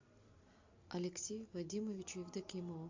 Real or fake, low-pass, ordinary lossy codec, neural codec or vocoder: real; 7.2 kHz; AAC, 48 kbps; none